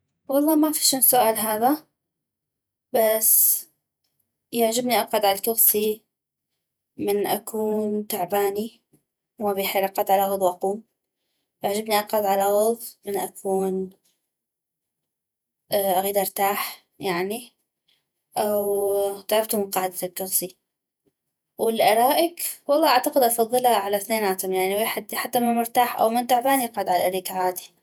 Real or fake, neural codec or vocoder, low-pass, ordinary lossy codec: fake; vocoder, 48 kHz, 128 mel bands, Vocos; none; none